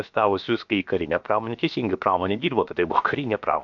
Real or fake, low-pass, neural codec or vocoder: fake; 7.2 kHz; codec, 16 kHz, about 1 kbps, DyCAST, with the encoder's durations